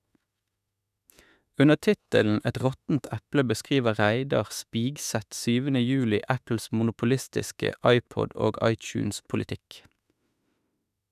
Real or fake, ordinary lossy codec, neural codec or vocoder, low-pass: fake; none; autoencoder, 48 kHz, 32 numbers a frame, DAC-VAE, trained on Japanese speech; 14.4 kHz